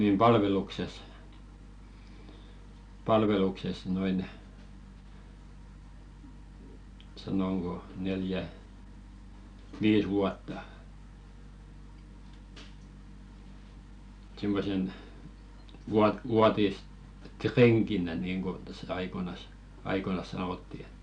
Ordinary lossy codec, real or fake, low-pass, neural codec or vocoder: none; real; 9.9 kHz; none